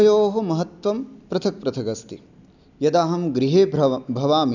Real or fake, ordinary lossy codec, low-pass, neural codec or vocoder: real; none; 7.2 kHz; none